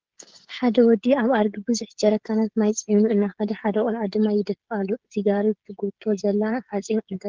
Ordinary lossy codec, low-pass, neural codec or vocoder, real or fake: Opus, 16 kbps; 7.2 kHz; codec, 16 kHz, 16 kbps, FreqCodec, smaller model; fake